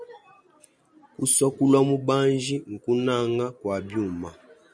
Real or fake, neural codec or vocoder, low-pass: real; none; 9.9 kHz